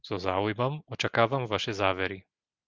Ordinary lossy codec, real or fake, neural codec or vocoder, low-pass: Opus, 24 kbps; real; none; 7.2 kHz